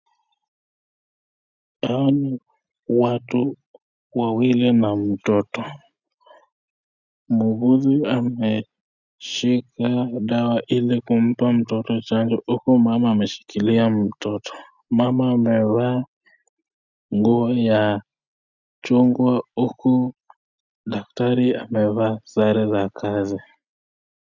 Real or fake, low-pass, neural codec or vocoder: real; 7.2 kHz; none